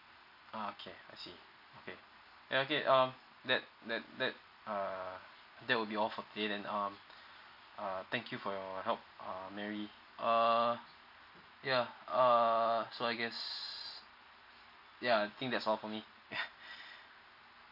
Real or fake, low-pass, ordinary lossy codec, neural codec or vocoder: real; 5.4 kHz; none; none